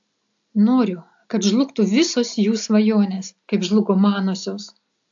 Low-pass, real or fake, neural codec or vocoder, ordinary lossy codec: 7.2 kHz; real; none; AAC, 64 kbps